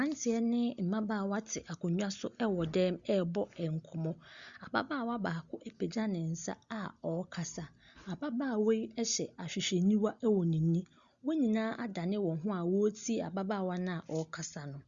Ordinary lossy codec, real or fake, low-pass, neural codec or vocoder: Opus, 64 kbps; real; 7.2 kHz; none